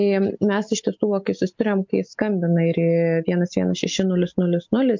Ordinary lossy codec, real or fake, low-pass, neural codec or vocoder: MP3, 64 kbps; real; 7.2 kHz; none